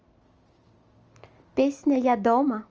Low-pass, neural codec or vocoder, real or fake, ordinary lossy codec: 7.2 kHz; none; real; Opus, 24 kbps